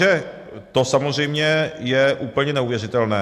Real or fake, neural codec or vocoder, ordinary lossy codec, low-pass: real; none; AAC, 64 kbps; 14.4 kHz